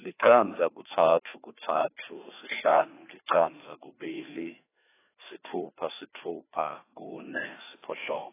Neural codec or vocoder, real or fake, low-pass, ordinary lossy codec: codec, 16 kHz, 2 kbps, FreqCodec, larger model; fake; 3.6 kHz; AAC, 16 kbps